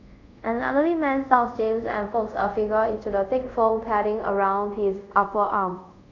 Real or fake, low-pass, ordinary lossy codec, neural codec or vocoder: fake; 7.2 kHz; none; codec, 24 kHz, 0.5 kbps, DualCodec